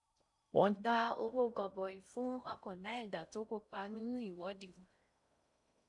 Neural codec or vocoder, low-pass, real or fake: codec, 16 kHz in and 24 kHz out, 0.6 kbps, FocalCodec, streaming, 4096 codes; 10.8 kHz; fake